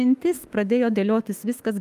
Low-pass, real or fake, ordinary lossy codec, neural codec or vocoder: 14.4 kHz; fake; Opus, 32 kbps; autoencoder, 48 kHz, 32 numbers a frame, DAC-VAE, trained on Japanese speech